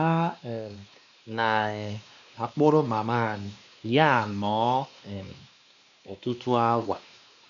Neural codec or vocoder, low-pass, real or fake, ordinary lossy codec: codec, 16 kHz, 2 kbps, X-Codec, WavLM features, trained on Multilingual LibriSpeech; 7.2 kHz; fake; none